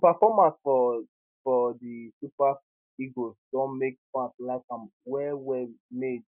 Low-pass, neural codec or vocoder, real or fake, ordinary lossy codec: 3.6 kHz; none; real; none